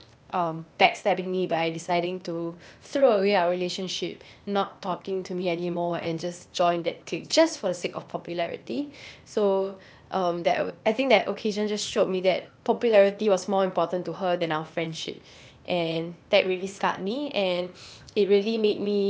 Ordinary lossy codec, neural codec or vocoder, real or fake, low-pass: none; codec, 16 kHz, 0.8 kbps, ZipCodec; fake; none